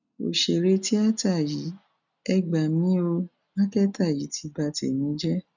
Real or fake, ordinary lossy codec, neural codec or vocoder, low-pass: real; none; none; 7.2 kHz